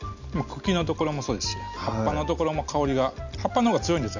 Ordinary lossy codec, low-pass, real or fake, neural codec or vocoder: none; 7.2 kHz; real; none